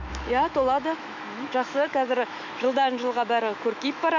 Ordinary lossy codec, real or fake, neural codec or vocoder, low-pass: none; fake; autoencoder, 48 kHz, 128 numbers a frame, DAC-VAE, trained on Japanese speech; 7.2 kHz